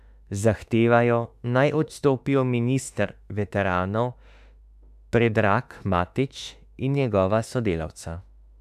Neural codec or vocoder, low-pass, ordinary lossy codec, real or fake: autoencoder, 48 kHz, 32 numbers a frame, DAC-VAE, trained on Japanese speech; 14.4 kHz; none; fake